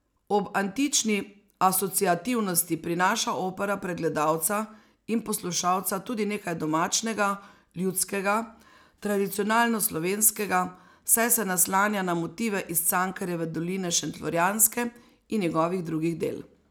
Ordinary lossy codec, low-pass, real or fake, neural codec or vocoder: none; none; real; none